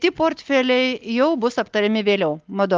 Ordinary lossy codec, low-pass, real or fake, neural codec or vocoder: Opus, 24 kbps; 7.2 kHz; real; none